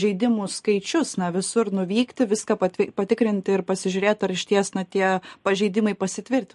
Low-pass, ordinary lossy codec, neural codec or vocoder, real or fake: 14.4 kHz; MP3, 48 kbps; none; real